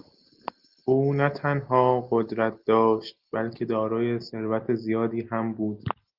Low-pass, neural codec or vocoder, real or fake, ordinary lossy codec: 5.4 kHz; none; real; Opus, 32 kbps